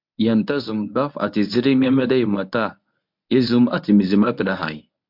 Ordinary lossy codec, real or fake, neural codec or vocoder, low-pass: MP3, 48 kbps; fake; codec, 24 kHz, 0.9 kbps, WavTokenizer, medium speech release version 1; 5.4 kHz